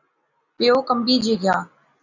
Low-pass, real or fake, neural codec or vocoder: 7.2 kHz; real; none